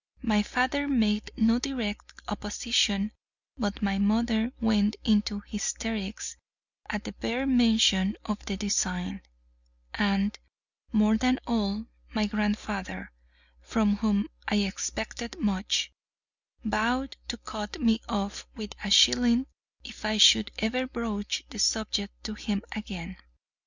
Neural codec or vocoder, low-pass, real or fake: none; 7.2 kHz; real